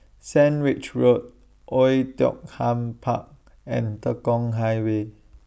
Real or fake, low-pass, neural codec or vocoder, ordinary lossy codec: real; none; none; none